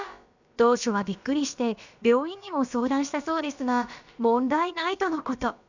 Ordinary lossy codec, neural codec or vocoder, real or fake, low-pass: none; codec, 16 kHz, about 1 kbps, DyCAST, with the encoder's durations; fake; 7.2 kHz